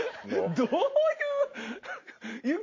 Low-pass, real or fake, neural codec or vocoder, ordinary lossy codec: 7.2 kHz; real; none; MP3, 32 kbps